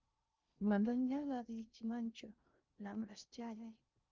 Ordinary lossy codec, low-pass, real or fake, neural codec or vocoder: Opus, 24 kbps; 7.2 kHz; fake; codec, 16 kHz in and 24 kHz out, 0.6 kbps, FocalCodec, streaming, 2048 codes